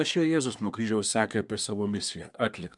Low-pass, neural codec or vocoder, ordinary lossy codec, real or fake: 10.8 kHz; codec, 24 kHz, 1 kbps, SNAC; MP3, 96 kbps; fake